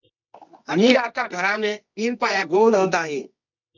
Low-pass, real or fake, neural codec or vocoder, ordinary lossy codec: 7.2 kHz; fake; codec, 24 kHz, 0.9 kbps, WavTokenizer, medium music audio release; MP3, 64 kbps